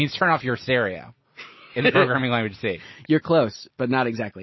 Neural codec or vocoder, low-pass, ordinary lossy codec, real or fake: none; 7.2 kHz; MP3, 24 kbps; real